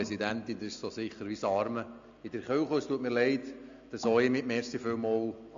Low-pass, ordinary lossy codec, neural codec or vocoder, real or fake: 7.2 kHz; AAC, 64 kbps; none; real